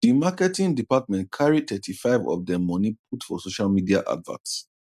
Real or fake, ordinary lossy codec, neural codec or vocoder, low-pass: real; none; none; 14.4 kHz